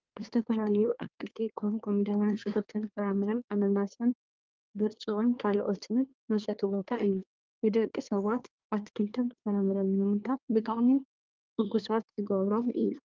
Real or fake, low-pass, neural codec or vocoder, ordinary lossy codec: fake; 7.2 kHz; codec, 24 kHz, 1 kbps, SNAC; Opus, 32 kbps